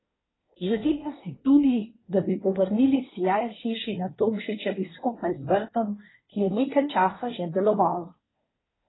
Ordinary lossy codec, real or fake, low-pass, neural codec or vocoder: AAC, 16 kbps; fake; 7.2 kHz; codec, 24 kHz, 1 kbps, SNAC